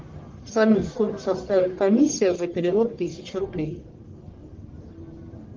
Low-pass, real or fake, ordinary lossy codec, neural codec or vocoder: 7.2 kHz; fake; Opus, 32 kbps; codec, 44.1 kHz, 1.7 kbps, Pupu-Codec